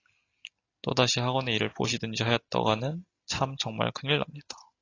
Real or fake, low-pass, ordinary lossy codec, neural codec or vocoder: real; 7.2 kHz; AAC, 32 kbps; none